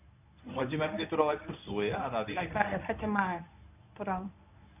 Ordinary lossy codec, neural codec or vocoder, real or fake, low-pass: none; codec, 24 kHz, 0.9 kbps, WavTokenizer, medium speech release version 1; fake; 3.6 kHz